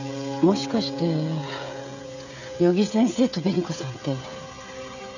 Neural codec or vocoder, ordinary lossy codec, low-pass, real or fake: codec, 16 kHz, 16 kbps, FreqCodec, smaller model; none; 7.2 kHz; fake